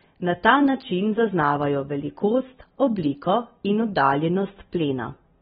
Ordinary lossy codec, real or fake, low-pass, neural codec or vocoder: AAC, 16 kbps; real; 19.8 kHz; none